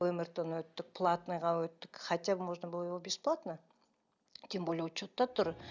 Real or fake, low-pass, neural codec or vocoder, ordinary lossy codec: real; 7.2 kHz; none; none